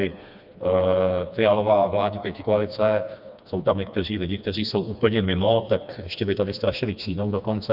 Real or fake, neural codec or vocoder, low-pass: fake; codec, 16 kHz, 2 kbps, FreqCodec, smaller model; 5.4 kHz